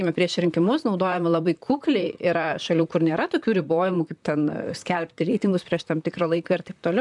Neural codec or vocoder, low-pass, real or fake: vocoder, 44.1 kHz, 128 mel bands, Pupu-Vocoder; 10.8 kHz; fake